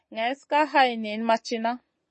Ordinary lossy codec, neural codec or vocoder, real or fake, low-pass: MP3, 32 kbps; none; real; 10.8 kHz